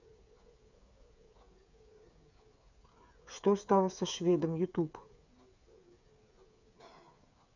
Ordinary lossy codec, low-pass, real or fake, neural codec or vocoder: none; 7.2 kHz; fake; codec, 16 kHz, 8 kbps, FreqCodec, smaller model